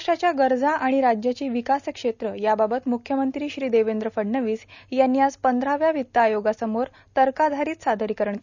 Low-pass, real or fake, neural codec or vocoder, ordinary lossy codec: 7.2 kHz; real; none; none